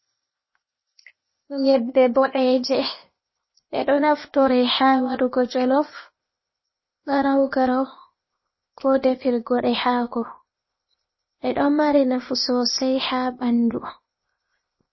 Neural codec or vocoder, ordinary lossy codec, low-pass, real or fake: codec, 16 kHz, 0.8 kbps, ZipCodec; MP3, 24 kbps; 7.2 kHz; fake